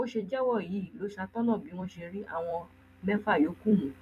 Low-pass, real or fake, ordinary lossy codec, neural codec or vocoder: 14.4 kHz; fake; none; vocoder, 44.1 kHz, 128 mel bands every 256 samples, BigVGAN v2